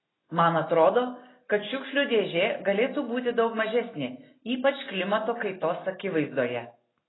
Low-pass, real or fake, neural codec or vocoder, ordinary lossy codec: 7.2 kHz; real; none; AAC, 16 kbps